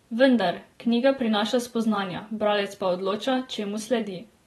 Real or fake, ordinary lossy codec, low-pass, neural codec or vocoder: fake; AAC, 32 kbps; 19.8 kHz; vocoder, 44.1 kHz, 128 mel bands every 512 samples, BigVGAN v2